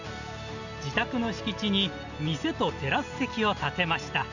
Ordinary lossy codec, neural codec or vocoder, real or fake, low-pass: none; none; real; 7.2 kHz